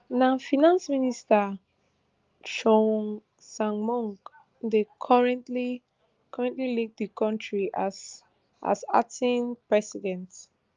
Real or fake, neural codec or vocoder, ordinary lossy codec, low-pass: real; none; Opus, 32 kbps; 7.2 kHz